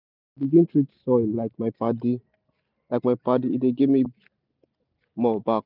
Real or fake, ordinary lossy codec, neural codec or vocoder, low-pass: real; none; none; 5.4 kHz